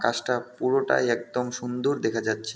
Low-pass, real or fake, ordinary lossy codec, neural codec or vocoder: none; real; none; none